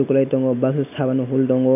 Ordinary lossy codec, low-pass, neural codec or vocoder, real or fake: none; 3.6 kHz; none; real